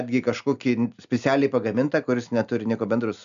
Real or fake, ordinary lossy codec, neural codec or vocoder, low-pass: real; MP3, 96 kbps; none; 7.2 kHz